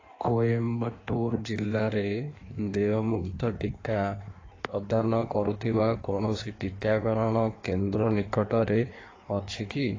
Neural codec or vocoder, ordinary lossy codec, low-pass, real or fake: codec, 16 kHz in and 24 kHz out, 1.1 kbps, FireRedTTS-2 codec; AAC, 32 kbps; 7.2 kHz; fake